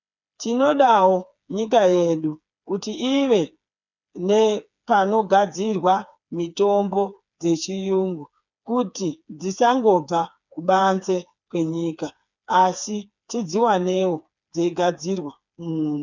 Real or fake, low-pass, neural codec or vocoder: fake; 7.2 kHz; codec, 16 kHz, 4 kbps, FreqCodec, smaller model